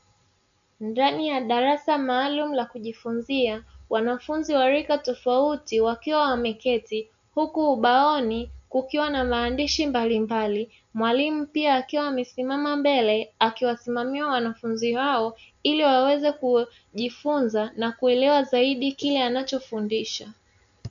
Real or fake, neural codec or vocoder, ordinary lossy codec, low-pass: real; none; AAC, 96 kbps; 7.2 kHz